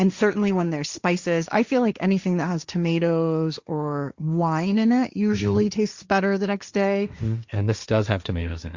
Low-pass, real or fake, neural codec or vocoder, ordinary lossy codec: 7.2 kHz; fake; codec, 16 kHz, 1.1 kbps, Voila-Tokenizer; Opus, 64 kbps